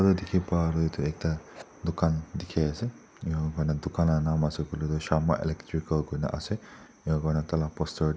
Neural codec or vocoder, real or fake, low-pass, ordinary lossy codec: none; real; none; none